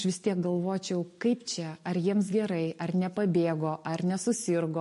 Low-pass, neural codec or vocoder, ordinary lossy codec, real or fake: 14.4 kHz; none; MP3, 48 kbps; real